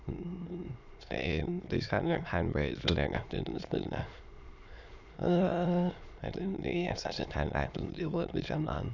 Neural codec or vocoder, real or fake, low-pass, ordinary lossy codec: autoencoder, 22.05 kHz, a latent of 192 numbers a frame, VITS, trained on many speakers; fake; 7.2 kHz; Opus, 64 kbps